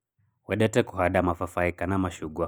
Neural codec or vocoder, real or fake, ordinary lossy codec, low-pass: none; real; none; none